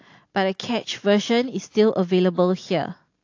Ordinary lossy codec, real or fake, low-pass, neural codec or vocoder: AAC, 48 kbps; real; 7.2 kHz; none